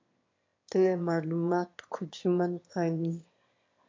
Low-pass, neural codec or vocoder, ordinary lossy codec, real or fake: 7.2 kHz; autoencoder, 22.05 kHz, a latent of 192 numbers a frame, VITS, trained on one speaker; MP3, 48 kbps; fake